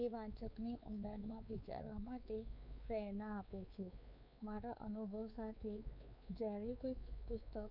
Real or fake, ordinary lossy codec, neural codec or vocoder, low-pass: fake; none; codec, 16 kHz, 4 kbps, X-Codec, WavLM features, trained on Multilingual LibriSpeech; 5.4 kHz